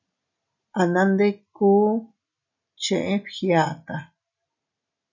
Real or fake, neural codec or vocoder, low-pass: real; none; 7.2 kHz